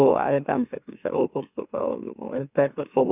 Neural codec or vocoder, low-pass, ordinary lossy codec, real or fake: autoencoder, 44.1 kHz, a latent of 192 numbers a frame, MeloTTS; 3.6 kHz; none; fake